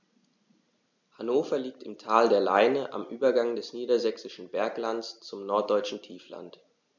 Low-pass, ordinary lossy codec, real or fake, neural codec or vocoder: 7.2 kHz; none; real; none